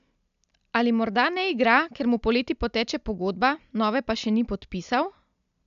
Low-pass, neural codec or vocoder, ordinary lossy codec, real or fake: 7.2 kHz; none; AAC, 96 kbps; real